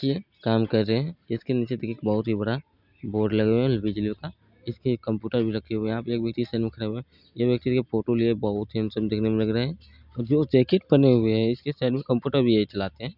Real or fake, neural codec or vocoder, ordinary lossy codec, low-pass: real; none; none; 5.4 kHz